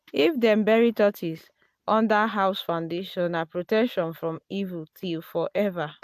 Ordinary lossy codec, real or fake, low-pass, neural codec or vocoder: AAC, 96 kbps; real; 14.4 kHz; none